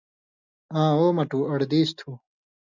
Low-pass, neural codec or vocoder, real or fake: 7.2 kHz; none; real